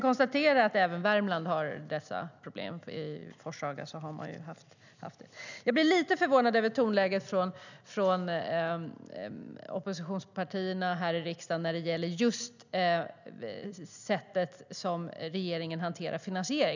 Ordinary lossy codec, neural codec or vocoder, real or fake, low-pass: none; none; real; 7.2 kHz